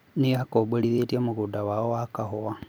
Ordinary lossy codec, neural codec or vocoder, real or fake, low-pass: none; vocoder, 44.1 kHz, 128 mel bands every 256 samples, BigVGAN v2; fake; none